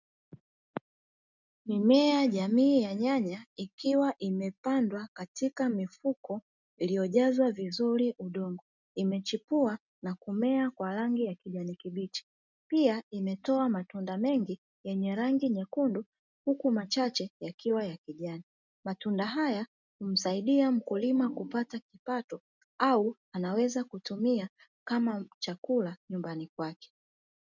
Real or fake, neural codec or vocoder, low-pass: real; none; 7.2 kHz